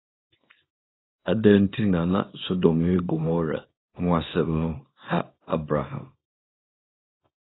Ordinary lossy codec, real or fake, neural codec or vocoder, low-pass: AAC, 16 kbps; fake; codec, 24 kHz, 0.9 kbps, WavTokenizer, small release; 7.2 kHz